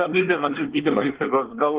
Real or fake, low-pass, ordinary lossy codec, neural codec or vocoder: fake; 3.6 kHz; Opus, 16 kbps; codec, 24 kHz, 1 kbps, SNAC